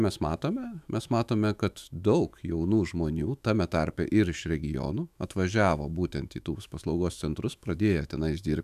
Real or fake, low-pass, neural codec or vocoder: fake; 14.4 kHz; autoencoder, 48 kHz, 128 numbers a frame, DAC-VAE, trained on Japanese speech